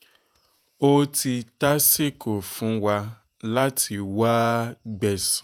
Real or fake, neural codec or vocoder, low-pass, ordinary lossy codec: fake; vocoder, 48 kHz, 128 mel bands, Vocos; none; none